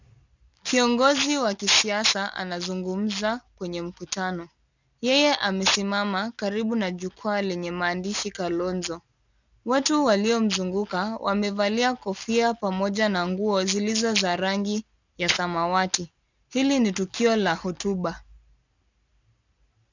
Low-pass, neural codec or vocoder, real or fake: 7.2 kHz; none; real